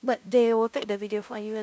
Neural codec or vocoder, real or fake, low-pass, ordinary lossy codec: codec, 16 kHz, 0.5 kbps, FunCodec, trained on LibriTTS, 25 frames a second; fake; none; none